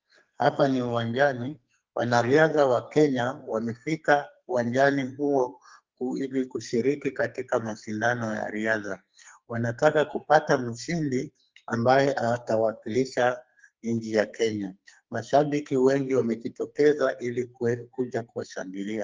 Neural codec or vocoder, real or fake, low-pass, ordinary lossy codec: codec, 44.1 kHz, 2.6 kbps, SNAC; fake; 7.2 kHz; Opus, 32 kbps